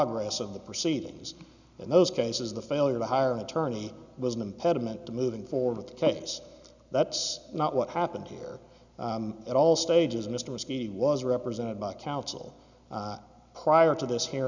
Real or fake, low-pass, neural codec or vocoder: real; 7.2 kHz; none